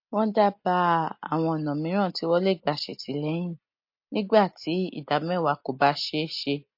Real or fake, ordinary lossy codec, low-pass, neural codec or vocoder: real; MP3, 32 kbps; 5.4 kHz; none